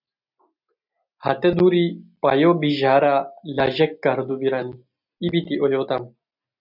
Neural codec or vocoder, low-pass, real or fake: none; 5.4 kHz; real